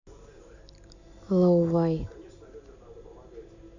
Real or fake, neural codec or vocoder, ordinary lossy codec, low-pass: real; none; none; 7.2 kHz